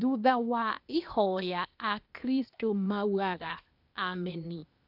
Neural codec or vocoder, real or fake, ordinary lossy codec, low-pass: codec, 16 kHz, 0.8 kbps, ZipCodec; fake; none; 5.4 kHz